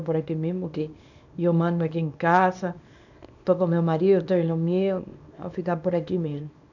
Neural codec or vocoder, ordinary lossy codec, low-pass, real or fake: codec, 24 kHz, 0.9 kbps, WavTokenizer, small release; none; 7.2 kHz; fake